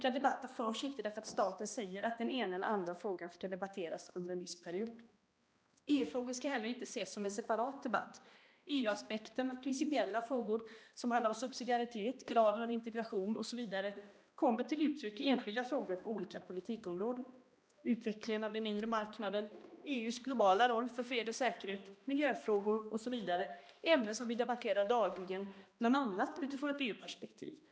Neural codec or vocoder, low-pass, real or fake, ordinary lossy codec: codec, 16 kHz, 1 kbps, X-Codec, HuBERT features, trained on balanced general audio; none; fake; none